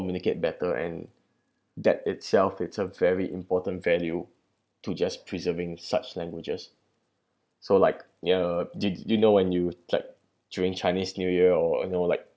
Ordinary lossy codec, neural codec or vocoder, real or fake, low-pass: none; none; real; none